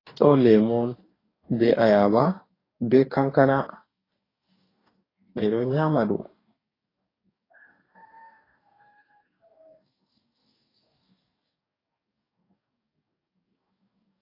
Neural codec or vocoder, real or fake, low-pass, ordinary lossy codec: codec, 44.1 kHz, 2.6 kbps, DAC; fake; 5.4 kHz; AAC, 24 kbps